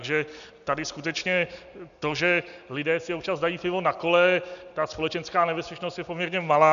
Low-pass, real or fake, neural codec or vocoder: 7.2 kHz; real; none